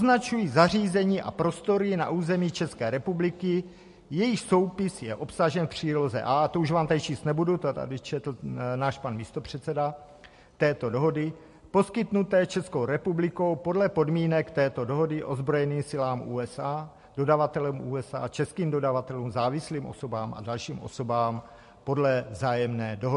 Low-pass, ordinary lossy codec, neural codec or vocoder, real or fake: 10.8 kHz; MP3, 48 kbps; none; real